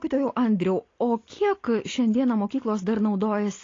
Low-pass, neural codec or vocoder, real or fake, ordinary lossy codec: 7.2 kHz; none; real; AAC, 32 kbps